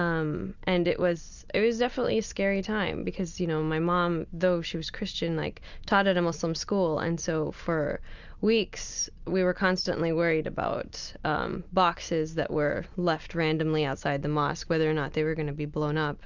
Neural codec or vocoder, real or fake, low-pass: none; real; 7.2 kHz